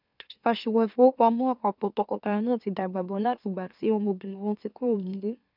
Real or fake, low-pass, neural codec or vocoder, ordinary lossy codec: fake; 5.4 kHz; autoencoder, 44.1 kHz, a latent of 192 numbers a frame, MeloTTS; none